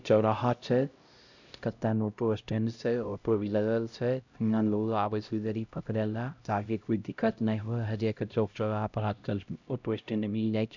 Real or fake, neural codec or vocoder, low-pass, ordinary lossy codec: fake; codec, 16 kHz, 0.5 kbps, X-Codec, HuBERT features, trained on LibriSpeech; 7.2 kHz; none